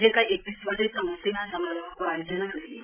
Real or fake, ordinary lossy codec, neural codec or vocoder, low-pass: fake; none; codec, 16 kHz, 16 kbps, FreqCodec, larger model; 3.6 kHz